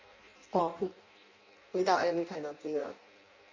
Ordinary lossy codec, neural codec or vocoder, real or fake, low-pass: MP3, 64 kbps; codec, 16 kHz in and 24 kHz out, 0.6 kbps, FireRedTTS-2 codec; fake; 7.2 kHz